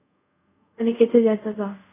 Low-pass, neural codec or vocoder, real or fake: 3.6 kHz; codec, 24 kHz, 0.5 kbps, DualCodec; fake